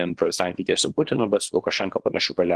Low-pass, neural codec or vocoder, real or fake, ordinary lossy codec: 10.8 kHz; codec, 24 kHz, 0.9 kbps, WavTokenizer, small release; fake; Opus, 16 kbps